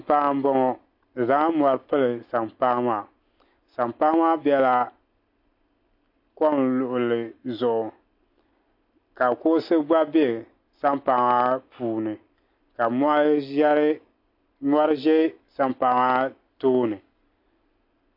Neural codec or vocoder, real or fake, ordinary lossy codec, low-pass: none; real; MP3, 32 kbps; 5.4 kHz